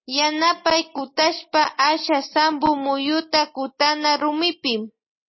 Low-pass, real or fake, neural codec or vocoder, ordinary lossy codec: 7.2 kHz; real; none; MP3, 24 kbps